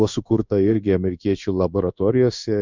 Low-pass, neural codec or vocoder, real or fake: 7.2 kHz; codec, 16 kHz in and 24 kHz out, 1 kbps, XY-Tokenizer; fake